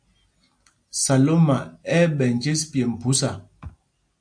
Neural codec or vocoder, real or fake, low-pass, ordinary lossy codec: none; real; 9.9 kHz; AAC, 64 kbps